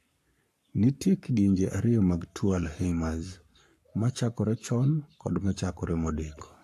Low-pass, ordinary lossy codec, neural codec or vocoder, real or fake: 14.4 kHz; AAC, 64 kbps; codec, 44.1 kHz, 7.8 kbps, Pupu-Codec; fake